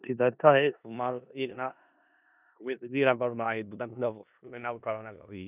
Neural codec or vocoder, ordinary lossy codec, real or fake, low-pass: codec, 16 kHz in and 24 kHz out, 0.4 kbps, LongCat-Audio-Codec, four codebook decoder; none; fake; 3.6 kHz